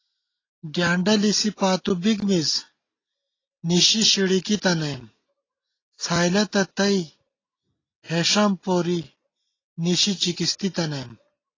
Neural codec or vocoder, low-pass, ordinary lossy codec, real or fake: none; 7.2 kHz; AAC, 32 kbps; real